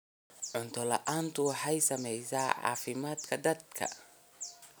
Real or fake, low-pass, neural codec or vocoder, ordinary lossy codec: real; none; none; none